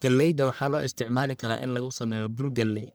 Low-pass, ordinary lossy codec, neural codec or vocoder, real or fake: none; none; codec, 44.1 kHz, 1.7 kbps, Pupu-Codec; fake